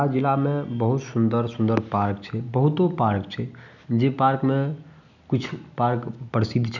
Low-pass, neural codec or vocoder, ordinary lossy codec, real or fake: 7.2 kHz; none; none; real